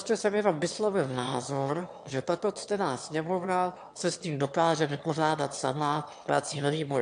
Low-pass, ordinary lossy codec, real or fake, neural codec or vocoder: 9.9 kHz; AAC, 64 kbps; fake; autoencoder, 22.05 kHz, a latent of 192 numbers a frame, VITS, trained on one speaker